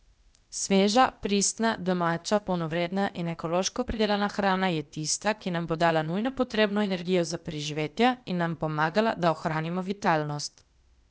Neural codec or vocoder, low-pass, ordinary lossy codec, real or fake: codec, 16 kHz, 0.8 kbps, ZipCodec; none; none; fake